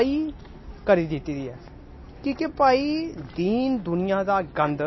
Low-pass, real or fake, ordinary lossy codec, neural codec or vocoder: 7.2 kHz; real; MP3, 24 kbps; none